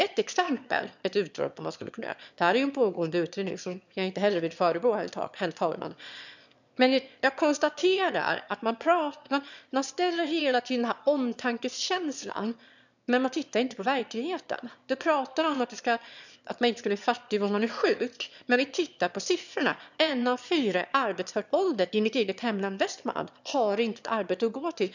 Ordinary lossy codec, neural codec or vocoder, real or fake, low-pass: none; autoencoder, 22.05 kHz, a latent of 192 numbers a frame, VITS, trained on one speaker; fake; 7.2 kHz